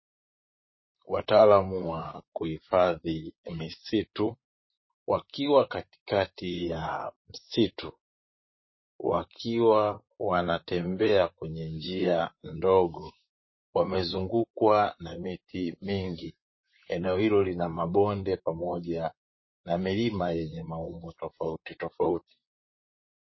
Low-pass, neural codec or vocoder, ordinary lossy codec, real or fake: 7.2 kHz; vocoder, 44.1 kHz, 128 mel bands, Pupu-Vocoder; MP3, 24 kbps; fake